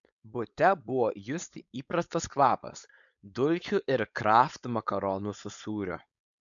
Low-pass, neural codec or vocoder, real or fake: 7.2 kHz; codec, 16 kHz, 4.8 kbps, FACodec; fake